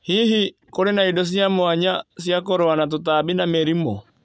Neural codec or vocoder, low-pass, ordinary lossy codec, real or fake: none; none; none; real